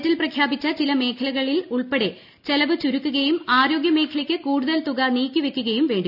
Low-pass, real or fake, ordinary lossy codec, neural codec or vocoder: 5.4 kHz; real; none; none